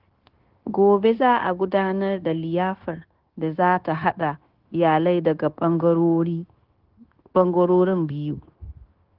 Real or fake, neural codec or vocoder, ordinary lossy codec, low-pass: fake; codec, 16 kHz, 0.9 kbps, LongCat-Audio-Codec; Opus, 16 kbps; 5.4 kHz